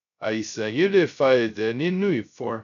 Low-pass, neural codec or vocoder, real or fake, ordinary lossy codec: 7.2 kHz; codec, 16 kHz, 0.2 kbps, FocalCodec; fake; none